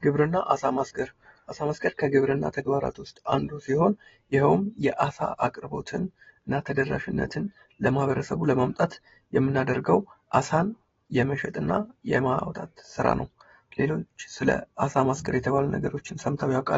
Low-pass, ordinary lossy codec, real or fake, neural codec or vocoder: 7.2 kHz; AAC, 24 kbps; real; none